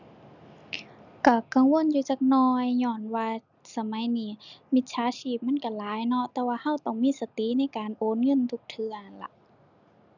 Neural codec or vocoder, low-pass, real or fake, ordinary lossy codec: none; 7.2 kHz; real; none